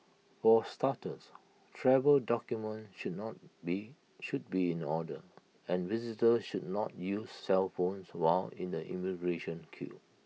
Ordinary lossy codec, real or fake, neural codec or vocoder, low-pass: none; real; none; none